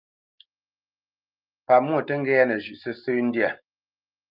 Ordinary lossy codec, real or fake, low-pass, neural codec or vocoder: Opus, 24 kbps; real; 5.4 kHz; none